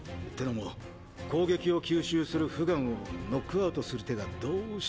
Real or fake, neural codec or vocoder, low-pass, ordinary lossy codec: real; none; none; none